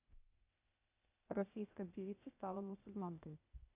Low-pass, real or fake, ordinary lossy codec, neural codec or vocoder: 3.6 kHz; fake; none; codec, 16 kHz, 0.8 kbps, ZipCodec